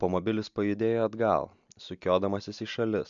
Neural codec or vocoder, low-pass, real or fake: none; 7.2 kHz; real